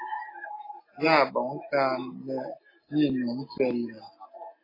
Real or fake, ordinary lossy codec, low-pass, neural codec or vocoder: real; AAC, 24 kbps; 5.4 kHz; none